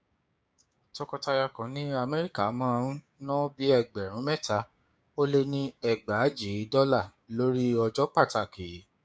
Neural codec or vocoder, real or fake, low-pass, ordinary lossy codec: codec, 16 kHz, 6 kbps, DAC; fake; none; none